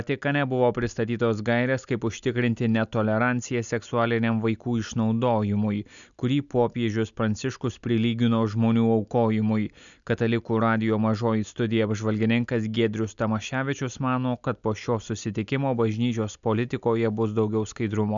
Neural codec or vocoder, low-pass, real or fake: none; 7.2 kHz; real